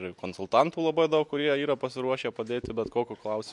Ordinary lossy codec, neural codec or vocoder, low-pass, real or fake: MP3, 64 kbps; none; 10.8 kHz; real